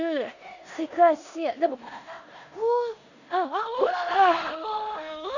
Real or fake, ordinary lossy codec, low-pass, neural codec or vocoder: fake; none; 7.2 kHz; codec, 16 kHz in and 24 kHz out, 0.9 kbps, LongCat-Audio-Codec, four codebook decoder